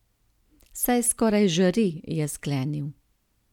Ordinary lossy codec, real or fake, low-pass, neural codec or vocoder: none; real; 19.8 kHz; none